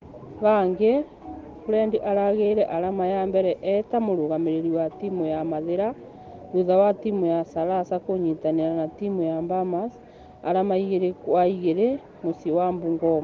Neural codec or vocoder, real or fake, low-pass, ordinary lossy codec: none; real; 7.2 kHz; Opus, 16 kbps